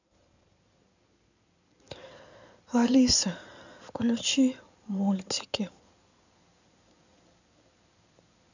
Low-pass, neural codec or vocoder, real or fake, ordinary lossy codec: 7.2 kHz; codec, 16 kHz in and 24 kHz out, 2.2 kbps, FireRedTTS-2 codec; fake; none